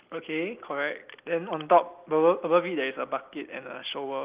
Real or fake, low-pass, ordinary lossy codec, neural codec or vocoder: real; 3.6 kHz; Opus, 24 kbps; none